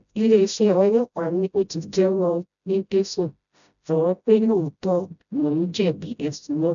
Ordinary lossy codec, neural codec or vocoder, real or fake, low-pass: none; codec, 16 kHz, 0.5 kbps, FreqCodec, smaller model; fake; 7.2 kHz